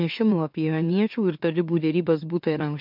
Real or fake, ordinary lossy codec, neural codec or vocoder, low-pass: fake; MP3, 48 kbps; autoencoder, 44.1 kHz, a latent of 192 numbers a frame, MeloTTS; 5.4 kHz